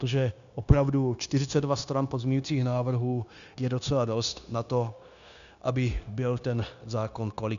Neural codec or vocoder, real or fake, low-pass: codec, 16 kHz, 0.9 kbps, LongCat-Audio-Codec; fake; 7.2 kHz